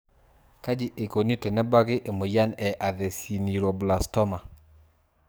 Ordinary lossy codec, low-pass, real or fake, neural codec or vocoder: none; none; fake; codec, 44.1 kHz, 7.8 kbps, DAC